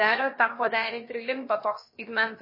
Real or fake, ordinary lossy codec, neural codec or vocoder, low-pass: fake; MP3, 24 kbps; codec, 16 kHz, about 1 kbps, DyCAST, with the encoder's durations; 5.4 kHz